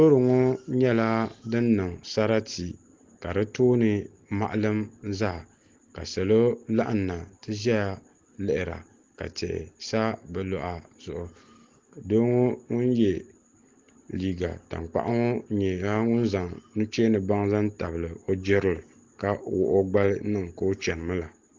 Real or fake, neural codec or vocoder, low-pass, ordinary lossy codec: real; none; 7.2 kHz; Opus, 16 kbps